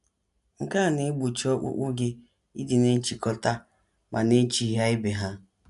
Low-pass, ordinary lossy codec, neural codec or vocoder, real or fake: 10.8 kHz; none; none; real